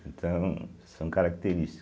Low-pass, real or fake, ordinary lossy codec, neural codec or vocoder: none; real; none; none